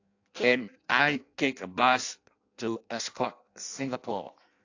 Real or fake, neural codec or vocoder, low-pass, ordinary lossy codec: fake; codec, 16 kHz in and 24 kHz out, 0.6 kbps, FireRedTTS-2 codec; 7.2 kHz; AAC, 48 kbps